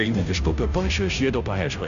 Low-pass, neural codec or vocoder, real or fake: 7.2 kHz; codec, 16 kHz, 0.5 kbps, FunCodec, trained on Chinese and English, 25 frames a second; fake